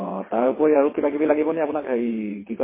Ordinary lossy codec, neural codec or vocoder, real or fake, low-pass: MP3, 16 kbps; vocoder, 22.05 kHz, 80 mel bands, WaveNeXt; fake; 3.6 kHz